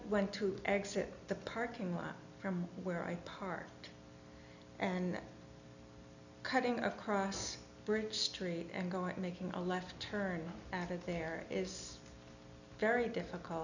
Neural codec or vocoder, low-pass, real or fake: none; 7.2 kHz; real